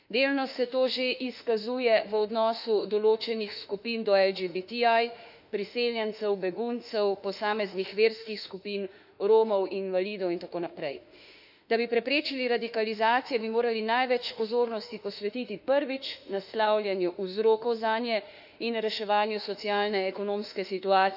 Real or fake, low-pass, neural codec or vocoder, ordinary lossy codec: fake; 5.4 kHz; autoencoder, 48 kHz, 32 numbers a frame, DAC-VAE, trained on Japanese speech; none